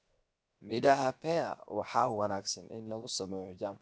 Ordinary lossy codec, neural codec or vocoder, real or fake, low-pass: none; codec, 16 kHz, 0.7 kbps, FocalCodec; fake; none